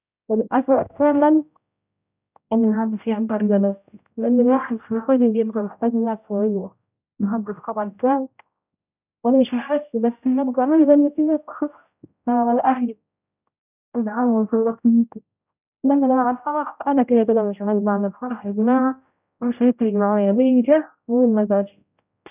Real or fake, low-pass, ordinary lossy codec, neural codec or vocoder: fake; 3.6 kHz; none; codec, 16 kHz, 0.5 kbps, X-Codec, HuBERT features, trained on general audio